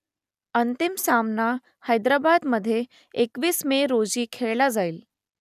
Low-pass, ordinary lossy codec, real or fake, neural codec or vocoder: 14.4 kHz; none; real; none